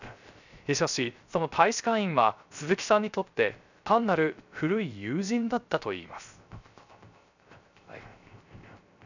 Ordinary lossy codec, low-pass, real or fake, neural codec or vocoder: none; 7.2 kHz; fake; codec, 16 kHz, 0.3 kbps, FocalCodec